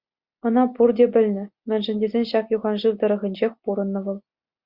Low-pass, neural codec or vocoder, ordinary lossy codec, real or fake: 5.4 kHz; none; Opus, 64 kbps; real